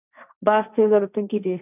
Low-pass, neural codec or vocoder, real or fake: 3.6 kHz; codec, 16 kHz, 1.1 kbps, Voila-Tokenizer; fake